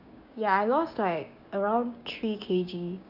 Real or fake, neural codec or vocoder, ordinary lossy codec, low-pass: fake; codec, 16 kHz, 6 kbps, DAC; none; 5.4 kHz